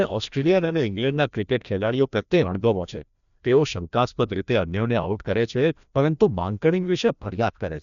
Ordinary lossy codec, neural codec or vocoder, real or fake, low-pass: MP3, 96 kbps; codec, 16 kHz, 1 kbps, FreqCodec, larger model; fake; 7.2 kHz